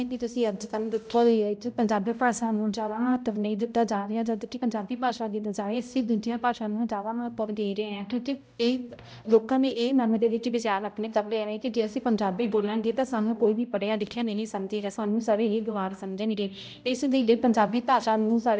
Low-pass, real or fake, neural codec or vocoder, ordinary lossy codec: none; fake; codec, 16 kHz, 0.5 kbps, X-Codec, HuBERT features, trained on balanced general audio; none